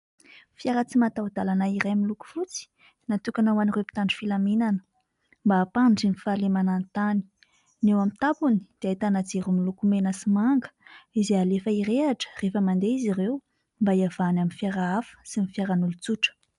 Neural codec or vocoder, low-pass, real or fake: none; 10.8 kHz; real